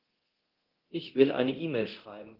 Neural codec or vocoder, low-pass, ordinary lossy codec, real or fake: codec, 24 kHz, 0.9 kbps, DualCodec; 5.4 kHz; Opus, 16 kbps; fake